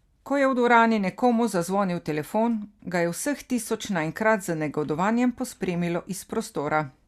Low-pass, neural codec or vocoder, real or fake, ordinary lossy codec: 14.4 kHz; none; real; Opus, 64 kbps